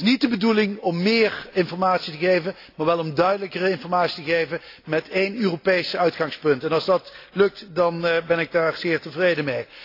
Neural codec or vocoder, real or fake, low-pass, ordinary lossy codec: none; real; 5.4 kHz; AAC, 32 kbps